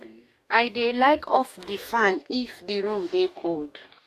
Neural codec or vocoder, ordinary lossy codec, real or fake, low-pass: codec, 44.1 kHz, 2.6 kbps, DAC; none; fake; 14.4 kHz